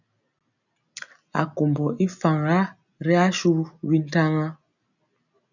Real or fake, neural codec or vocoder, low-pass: real; none; 7.2 kHz